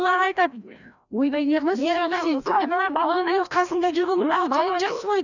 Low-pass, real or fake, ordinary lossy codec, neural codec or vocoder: 7.2 kHz; fake; none; codec, 16 kHz, 1 kbps, FreqCodec, larger model